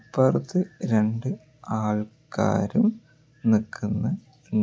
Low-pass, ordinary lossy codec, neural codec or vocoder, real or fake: none; none; none; real